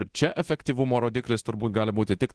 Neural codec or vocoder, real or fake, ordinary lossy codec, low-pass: codec, 24 kHz, 0.9 kbps, DualCodec; fake; Opus, 16 kbps; 10.8 kHz